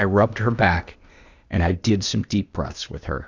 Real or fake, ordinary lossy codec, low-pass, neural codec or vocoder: fake; Opus, 64 kbps; 7.2 kHz; codec, 16 kHz, 0.8 kbps, ZipCodec